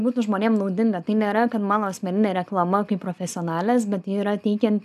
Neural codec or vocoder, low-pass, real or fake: none; 14.4 kHz; real